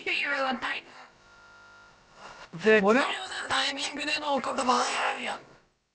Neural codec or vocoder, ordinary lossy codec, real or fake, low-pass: codec, 16 kHz, about 1 kbps, DyCAST, with the encoder's durations; none; fake; none